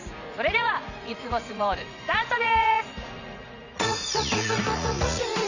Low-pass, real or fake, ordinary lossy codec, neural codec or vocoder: 7.2 kHz; fake; none; vocoder, 44.1 kHz, 80 mel bands, Vocos